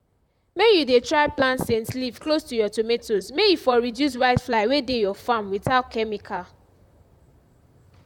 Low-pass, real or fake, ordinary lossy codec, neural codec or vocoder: 19.8 kHz; fake; none; vocoder, 44.1 kHz, 128 mel bands, Pupu-Vocoder